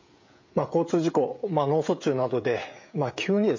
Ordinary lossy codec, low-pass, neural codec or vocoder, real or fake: MP3, 48 kbps; 7.2 kHz; codec, 16 kHz, 16 kbps, FreqCodec, smaller model; fake